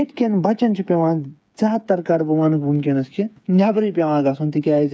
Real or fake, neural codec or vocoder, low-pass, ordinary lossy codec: fake; codec, 16 kHz, 8 kbps, FreqCodec, smaller model; none; none